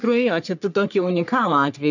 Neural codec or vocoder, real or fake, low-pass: codec, 44.1 kHz, 3.4 kbps, Pupu-Codec; fake; 7.2 kHz